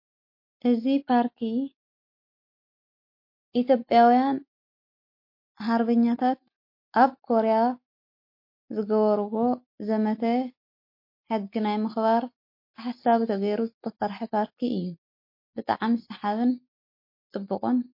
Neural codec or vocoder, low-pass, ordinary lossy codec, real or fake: none; 5.4 kHz; MP3, 32 kbps; real